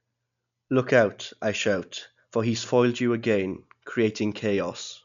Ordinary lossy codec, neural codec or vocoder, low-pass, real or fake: none; none; 7.2 kHz; real